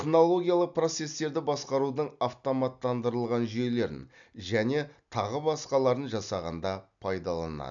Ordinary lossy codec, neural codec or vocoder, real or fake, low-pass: none; none; real; 7.2 kHz